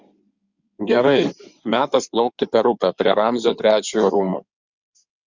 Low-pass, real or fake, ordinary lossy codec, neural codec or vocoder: 7.2 kHz; fake; Opus, 64 kbps; codec, 16 kHz in and 24 kHz out, 2.2 kbps, FireRedTTS-2 codec